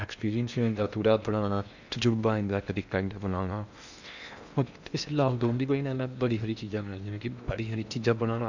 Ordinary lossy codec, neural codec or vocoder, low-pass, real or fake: none; codec, 16 kHz in and 24 kHz out, 0.8 kbps, FocalCodec, streaming, 65536 codes; 7.2 kHz; fake